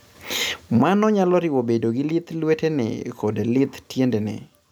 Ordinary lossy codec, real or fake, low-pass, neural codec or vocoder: none; real; none; none